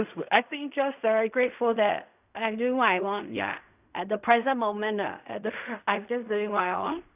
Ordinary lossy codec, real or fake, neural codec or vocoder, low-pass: none; fake; codec, 16 kHz in and 24 kHz out, 0.4 kbps, LongCat-Audio-Codec, fine tuned four codebook decoder; 3.6 kHz